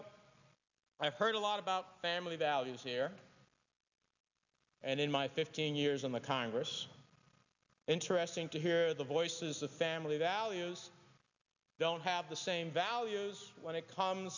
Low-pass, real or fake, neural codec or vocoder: 7.2 kHz; real; none